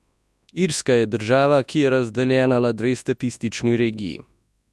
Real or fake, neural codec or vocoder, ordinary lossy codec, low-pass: fake; codec, 24 kHz, 0.9 kbps, WavTokenizer, large speech release; none; none